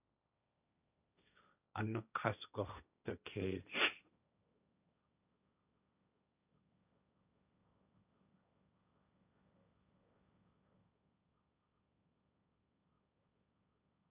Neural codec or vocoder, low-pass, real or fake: codec, 16 kHz, 1.1 kbps, Voila-Tokenizer; 3.6 kHz; fake